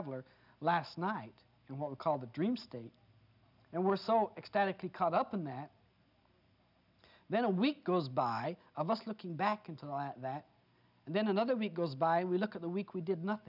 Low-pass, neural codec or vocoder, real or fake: 5.4 kHz; none; real